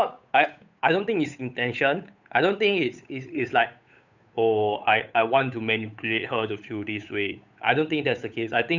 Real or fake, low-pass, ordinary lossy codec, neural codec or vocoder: fake; 7.2 kHz; none; codec, 16 kHz, 8 kbps, FunCodec, trained on Chinese and English, 25 frames a second